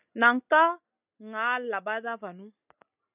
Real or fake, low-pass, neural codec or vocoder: real; 3.6 kHz; none